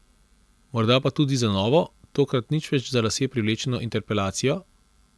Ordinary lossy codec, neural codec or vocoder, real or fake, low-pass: none; none; real; none